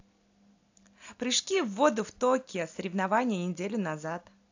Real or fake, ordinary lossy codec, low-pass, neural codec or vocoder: real; AAC, 48 kbps; 7.2 kHz; none